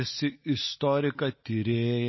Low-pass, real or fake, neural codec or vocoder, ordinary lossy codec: 7.2 kHz; real; none; MP3, 24 kbps